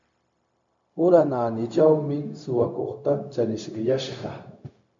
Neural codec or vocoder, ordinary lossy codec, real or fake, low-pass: codec, 16 kHz, 0.4 kbps, LongCat-Audio-Codec; MP3, 48 kbps; fake; 7.2 kHz